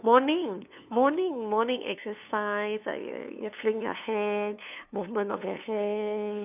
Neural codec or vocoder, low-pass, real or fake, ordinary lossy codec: codec, 16 kHz, 2 kbps, FunCodec, trained on LibriTTS, 25 frames a second; 3.6 kHz; fake; none